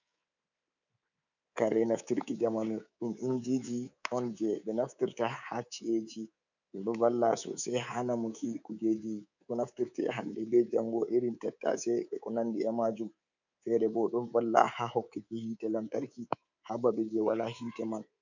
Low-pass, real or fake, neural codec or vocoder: 7.2 kHz; fake; codec, 24 kHz, 3.1 kbps, DualCodec